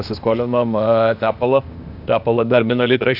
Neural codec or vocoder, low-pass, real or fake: codec, 16 kHz, 0.8 kbps, ZipCodec; 5.4 kHz; fake